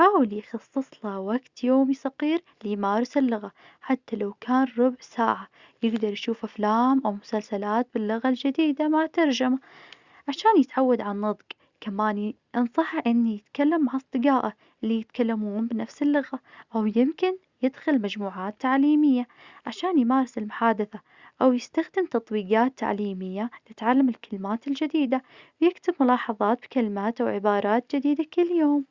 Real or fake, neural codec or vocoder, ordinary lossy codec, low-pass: real; none; Opus, 64 kbps; 7.2 kHz